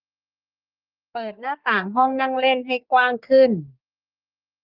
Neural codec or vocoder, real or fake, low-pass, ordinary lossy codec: codec, 44.1 kHz, 3.4 kbps, Pupu-Codec; fake; 5.4 kHz; Opus, 24 kbps